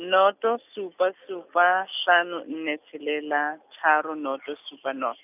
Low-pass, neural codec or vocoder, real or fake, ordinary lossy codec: 3.6 kHz; none; real; none